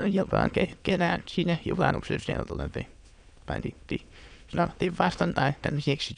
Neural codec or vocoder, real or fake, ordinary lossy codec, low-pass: autoencoder, 22.05 kHz, a latent of 192 numbers a frame, VITS, trained on many speakers; fake; none; 9.9 kHz